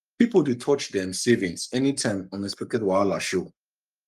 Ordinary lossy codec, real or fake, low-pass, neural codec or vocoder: Opus, 32 kbps; fake; 14.4 kHz; codec, 44.1 kHz, 7.8 kbps, Pupu-Codec